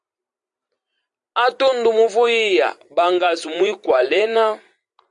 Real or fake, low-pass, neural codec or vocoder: fake; 10.8 kHz; vocoder, 44.1 kHz, 128 mel bands every 256 samples, BigVGAN v2